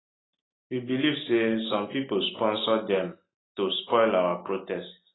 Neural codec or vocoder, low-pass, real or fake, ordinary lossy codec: none; 7.2 kHz; real; AAC, 16 kbps